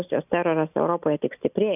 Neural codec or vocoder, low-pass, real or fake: none; 3.6 kHz; real